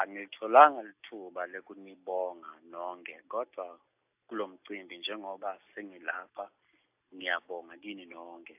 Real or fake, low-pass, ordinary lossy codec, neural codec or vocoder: real; 3.6 kHz; AAC, 32 kbps; none